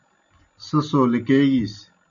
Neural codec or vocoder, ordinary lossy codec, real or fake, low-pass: none; AAC, 64 kbps; real; 7.2 kHz